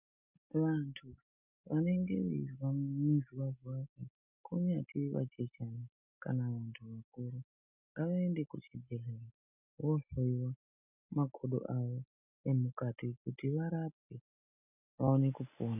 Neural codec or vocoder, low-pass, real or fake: none; 3.6 kHz; real